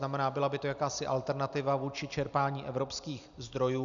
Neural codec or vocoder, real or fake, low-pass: none; real; 7.2 kHz